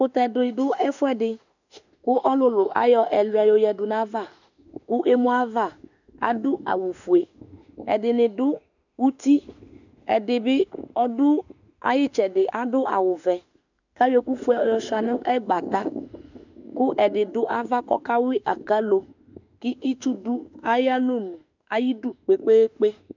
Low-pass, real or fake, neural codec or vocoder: 7.2 kHz; fake; autoencoder, 48 kHz, 32 numbers a frame, DAC-VAE, trained on Japanese speech